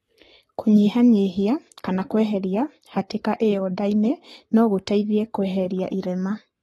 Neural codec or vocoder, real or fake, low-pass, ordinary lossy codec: codec, 44.1 kHz, 7.8 kbps, Pupu-Codec; fake; 19.8 kHz; AAC, 32 kbps